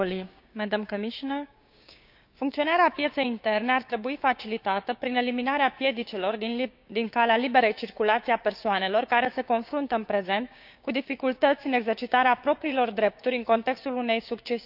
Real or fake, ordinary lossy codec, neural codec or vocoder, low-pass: fake; none; codec, 16 kHz, 6 kbps, DAC; 5.4 kHz